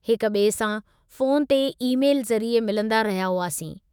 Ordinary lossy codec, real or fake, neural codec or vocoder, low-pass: none; fake; autoencoder, 48 kHz, 128 numbers a frame, DAC-VAE, trained on Japanese speech; none